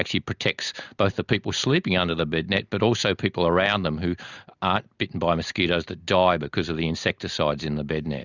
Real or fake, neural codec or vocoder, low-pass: real; none; 7.2 kHz